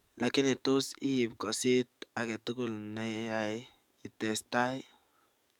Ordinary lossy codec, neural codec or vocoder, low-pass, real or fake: none; codec, 44.1 kHz, 7.8 kbps, DAC; 19.8 kHz; fake